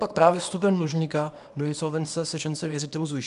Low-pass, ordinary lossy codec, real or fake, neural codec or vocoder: 10.8 kHz; MP3, 96 kbps; fake; codec, 24 kHz, 0.9 kbps, WavTokenizer, small release